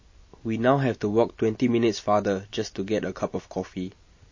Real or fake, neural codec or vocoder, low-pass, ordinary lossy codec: real; none; 7.2 kHz; MP3, 32 kbps